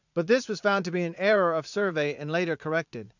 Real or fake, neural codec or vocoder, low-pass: fake; vocoder, 44.1 kHz, 80 mel bands, Vocos; 7.2 kHz